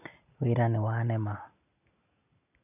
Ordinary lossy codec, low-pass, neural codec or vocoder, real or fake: none; 3.6 kHz; none; real